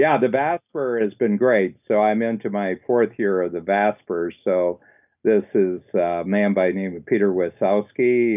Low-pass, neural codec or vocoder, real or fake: 3.6 kHz; none; real